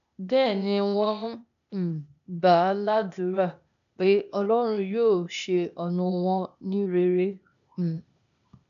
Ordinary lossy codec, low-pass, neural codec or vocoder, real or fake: none; 7.2 kHz; codec, 16 kHz, 0.8 kbps, ZipCodec; fake